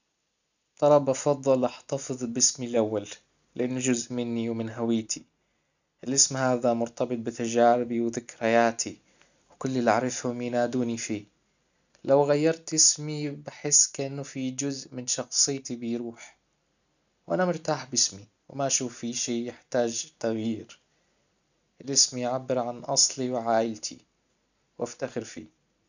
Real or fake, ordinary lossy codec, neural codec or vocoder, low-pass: real; none; none; 7.2 kHz